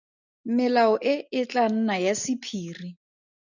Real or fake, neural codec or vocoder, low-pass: real; none; 7.2 kHz